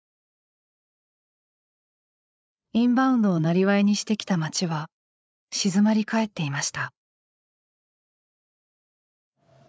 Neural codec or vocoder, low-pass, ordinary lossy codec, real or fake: codec, 16 kHz, 8 kbps, FreqCodec, larger model; none; none; fake